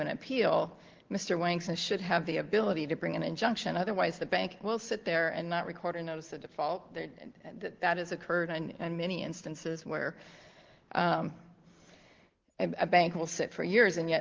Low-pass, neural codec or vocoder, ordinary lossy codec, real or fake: 7.2 kHz; none; Opus, 16 kbps; real